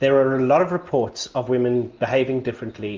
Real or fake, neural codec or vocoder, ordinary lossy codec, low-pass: real; none; Opus, 32 kbps; 7.2 kHz